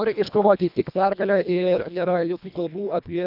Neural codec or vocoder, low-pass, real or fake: codec, 24 kHz, 1.5 kbps, HILCodec; 5.4 kHz; fake